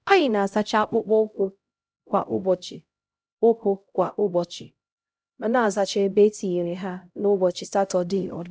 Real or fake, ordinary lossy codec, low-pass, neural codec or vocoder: fake; none; none; codec, 16 kHz, 0.5 kbps, X-Codec, HuBERT features, trained on LibriSpeech